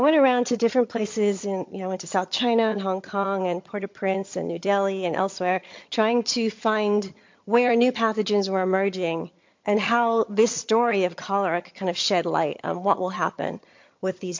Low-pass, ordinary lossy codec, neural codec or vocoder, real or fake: 7.2 kHz; MP3, 48 kbps; vocoder, 22.05 kHz, 80 mel bands, HiFi-GAN; fake